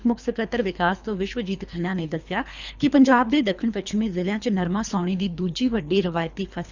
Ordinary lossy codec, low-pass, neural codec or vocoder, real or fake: Opus, 64 kbps; 7.2 kHz; codec, 24 kHz, 3 kbps, HILCodec; fake